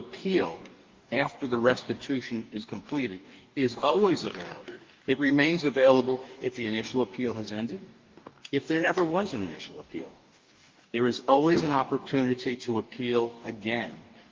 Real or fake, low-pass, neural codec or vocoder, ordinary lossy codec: fake; 7.2 kHz; codec, 44.1 kHz, 2.6 kbps, DAC; Opus, 32 kbps